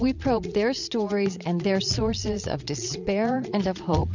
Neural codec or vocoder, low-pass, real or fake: vocoder, 22.05 kHz, 80 mel bands, Vocos; 7.2 kHz; fake